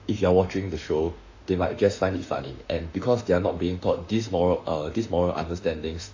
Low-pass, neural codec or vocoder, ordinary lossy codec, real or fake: 7.2 kHz; autoencoder, 48 kHz, 32 numbers a frame, DAC-VAE, trained on Japanese speech; AAC, 48 kbps; fake